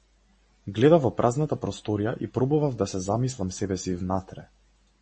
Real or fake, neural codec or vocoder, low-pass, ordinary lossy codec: real; none; 10.8 kHz; MP3, 32 kbps